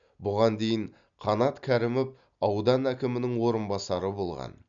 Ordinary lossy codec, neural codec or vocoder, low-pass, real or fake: none; none; 7.2 kHz; real